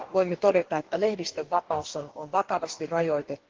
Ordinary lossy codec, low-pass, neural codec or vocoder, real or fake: Opus, 16 kbps; 7.2 kHz; codec, 44.1 kHz, 2.6 kbps, DAC; fake